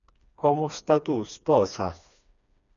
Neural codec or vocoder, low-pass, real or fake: codec, 16 kHz, 2 kbps, FreqCodec, smaller model; 7.2 kHz; fake